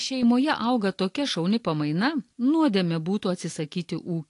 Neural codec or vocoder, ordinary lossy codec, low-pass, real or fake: none; AAC, 48 kbps; 10.8 kHz; real